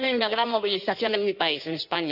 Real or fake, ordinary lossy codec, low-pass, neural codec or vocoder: fake; none; 5.4 kHz; codec, 16 kHz in and 24 kHz out, 1.1 kbps, FireRedTTS-2 codec